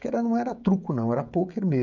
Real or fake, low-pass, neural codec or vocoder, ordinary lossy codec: fake; 7.2 kHz; codec, 16 kHz, 16 kbps, FreqCodec, smaller model; none